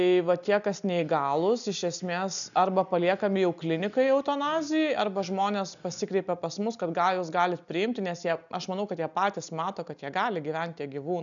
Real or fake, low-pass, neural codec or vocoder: real; 7.2 kHz; none